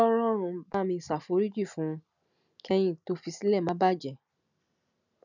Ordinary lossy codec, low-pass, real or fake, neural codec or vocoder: none; 7.2 kHz; real; none